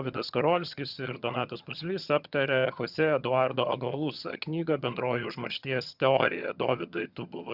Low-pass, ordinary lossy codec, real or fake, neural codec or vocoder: 5.4 kHz; Opus, 64 kbps; fake; vocoder, 22.05 kHz, 80 mel bands, HiFi-GAN